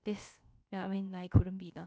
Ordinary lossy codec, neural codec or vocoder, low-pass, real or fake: none; codec, 16 kHz, about 1 kbps, DyCAST, with the encoder's durations; none; fake